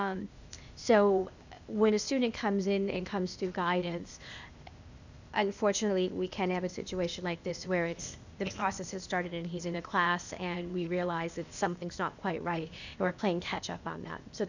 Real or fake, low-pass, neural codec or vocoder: fake; 7.2 kHz; codec, 16 kHz, 0.8 kbps, ZipCodec